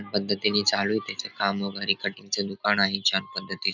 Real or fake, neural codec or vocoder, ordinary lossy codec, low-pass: real; none; none; 7.2 kHz